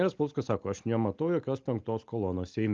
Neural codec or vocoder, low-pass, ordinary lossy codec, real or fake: none; 7.2 kHz; Opus, 24 kbps; real